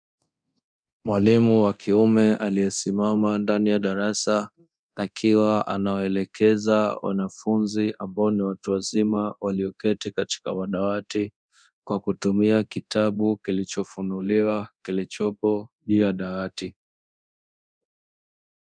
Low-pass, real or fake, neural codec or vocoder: 9.9 kHz; fake; codec, 24 kHz, 0.9 kbps, DualCodec